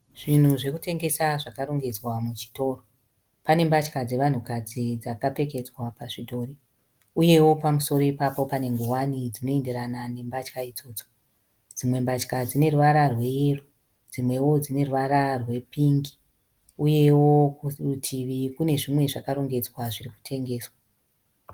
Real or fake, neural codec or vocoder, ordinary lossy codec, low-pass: real; none; Opus, 24 kbps; 19.8 kHz